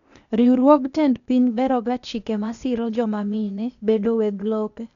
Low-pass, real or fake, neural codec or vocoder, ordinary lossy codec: 7.2 kHz; fake; codec, 16 kHz, 0.8 kbps, ZipCodec; none